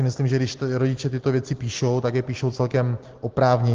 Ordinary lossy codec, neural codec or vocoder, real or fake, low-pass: Opus, 16 kbps; none; real; 7.2 kHz